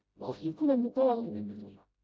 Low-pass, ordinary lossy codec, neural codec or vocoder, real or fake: none; none; codec, 16 kHz, 0.5 kbps, FreqCodec, smaller model; fake